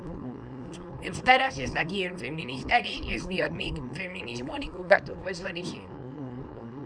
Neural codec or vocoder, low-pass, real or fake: codec, 24 kHz, 0.9 kbps, WavTokenizer, small release; 9.9 kHz; fake